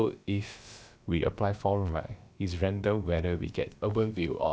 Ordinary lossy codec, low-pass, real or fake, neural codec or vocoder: none; none; fake; codec, 16 kHz, about 1 kbps, DyCAST, with the encoder's durations